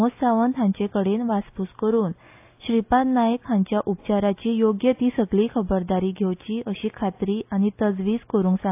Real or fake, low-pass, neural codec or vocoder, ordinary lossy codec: real; 3.6 kHz; none; AAC, 32 kbps